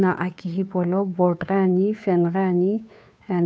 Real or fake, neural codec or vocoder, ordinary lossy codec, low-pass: fake; codec, 16 kHz, 2 kbps, FunCodec, trained on Chinese and English, 25 frames a second; none; none